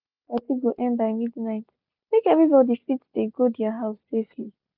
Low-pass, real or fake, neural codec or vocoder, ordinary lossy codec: 5.4 kHz; real; none; none